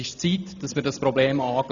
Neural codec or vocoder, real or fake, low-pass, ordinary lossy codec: none; real; 7.2 kHz; none